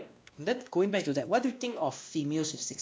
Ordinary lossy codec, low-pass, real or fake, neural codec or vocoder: none; none; fake; codec, 16 kHz, 1 kbps, X-Codec, WavLM features, trained on Multilingual LibriSpeech